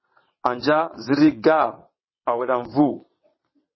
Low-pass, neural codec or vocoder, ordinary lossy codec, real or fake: 7.2 kHz; vocoder, 22.05 kHz, 80 mel bands, WaveNeXt; MP3, 24 kbps; fake